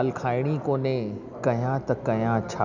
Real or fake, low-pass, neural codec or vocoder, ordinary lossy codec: real; 7.2 kHz; none; none